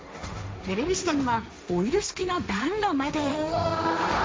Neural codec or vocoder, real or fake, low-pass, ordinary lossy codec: codec, 16 kHz, 1.1 kbps, Voila-Tokenizer; fake; none; none